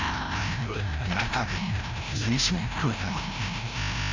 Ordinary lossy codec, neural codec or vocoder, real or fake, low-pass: none; codec, 16 kHz, 0.5 kbps, FreqCodec, larger model; fake; 7.2 kHz